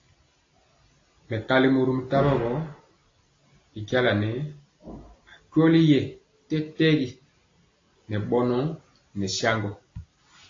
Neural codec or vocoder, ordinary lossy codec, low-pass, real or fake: none; AAC, 32 kbps; 7.2 kHz; real